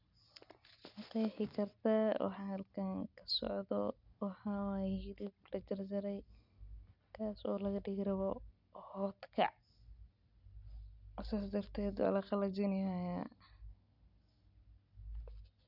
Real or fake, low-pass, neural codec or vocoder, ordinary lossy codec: real; 5.4 kHz; none; none